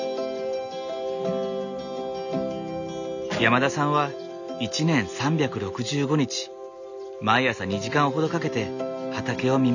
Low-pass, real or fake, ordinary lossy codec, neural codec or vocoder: 7.2 kHz; real; none; none